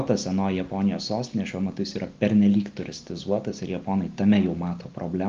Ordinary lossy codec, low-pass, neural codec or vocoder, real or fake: Opus, 32 kbps; 7.2 kHz; none; real